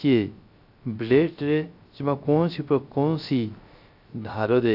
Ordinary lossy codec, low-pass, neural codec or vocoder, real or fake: none; 5.4 kHz; codec, 16 kHz, 0.3 kbps, FocalCodec; fake